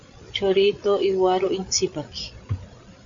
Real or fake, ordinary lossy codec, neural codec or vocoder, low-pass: fake; AAC, 64 kbps; codec, 16 kHz, 8 kbps, FreqCodec, larger model; 7.2 kHz